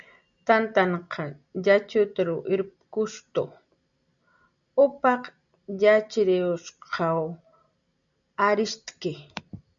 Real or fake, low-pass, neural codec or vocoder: real; 7.2 kHz; none